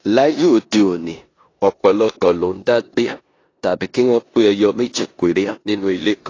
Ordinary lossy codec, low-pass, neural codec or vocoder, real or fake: AAC, 32 kbps; 7.2 kHz; codec, 16 kHz in and 24 kHz out, 0.9 kbps, LongCat-Audio-Codec, four codebook decoder; fake